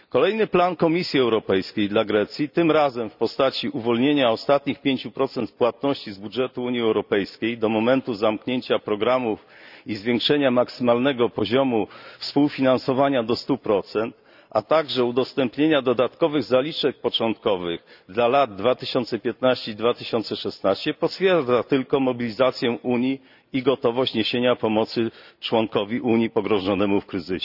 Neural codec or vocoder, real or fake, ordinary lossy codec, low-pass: none; real; none; 5.4 kHz